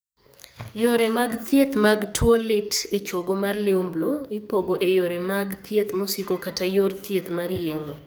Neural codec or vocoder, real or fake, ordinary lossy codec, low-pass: codec, 44.1 kHz, 2.6 kbps, SNAC; fake; none; none